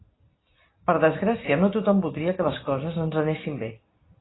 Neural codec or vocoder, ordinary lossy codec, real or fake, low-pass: none; AAC, 16 kbps; real; 7.2 kHz